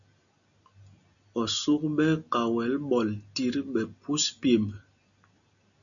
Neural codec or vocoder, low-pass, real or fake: none; 7.2 kHz; real